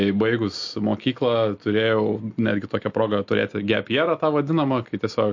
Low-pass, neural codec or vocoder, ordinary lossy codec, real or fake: 7.2 kHz; none; MP3, 48 kbps; real